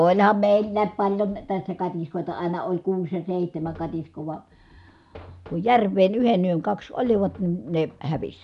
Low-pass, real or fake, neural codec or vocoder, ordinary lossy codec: 10.8 kHz; real; none; none